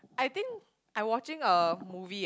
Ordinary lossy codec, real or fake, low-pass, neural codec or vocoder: none; real; none; none